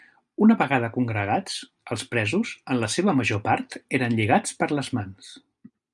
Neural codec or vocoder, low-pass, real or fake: none; 10.8 kHz; real